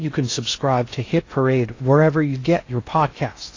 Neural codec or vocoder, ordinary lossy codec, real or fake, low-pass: codec, 16 kHz in and 24 kHz out, 0.6 kbps, FocalCodec, streaming, 2048 codes; AAC, 32 kbps; fake; 7.2 kHz